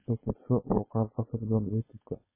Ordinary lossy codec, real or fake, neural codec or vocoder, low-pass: MP3, 16 kbps; fake; codec, 16 kHz, 4.8 kbps, FACodec; 3.6 kHz